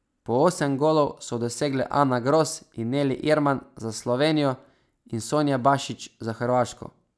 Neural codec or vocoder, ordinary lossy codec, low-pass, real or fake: none; none; none; real